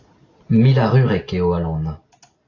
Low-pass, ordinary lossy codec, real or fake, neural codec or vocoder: 7.2 kHz; AAC, 32 kbps; real; none